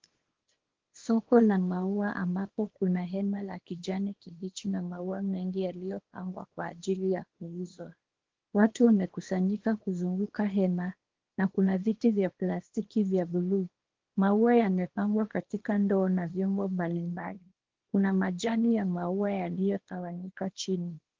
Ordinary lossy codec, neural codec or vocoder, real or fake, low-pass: Opus, 16 kbps; codec, 24 kHz, 0.9 kbps, WavTokenizer, small release; fake; 7.2 kHz